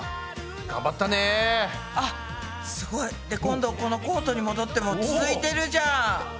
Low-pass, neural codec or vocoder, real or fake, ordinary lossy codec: none; none; real; none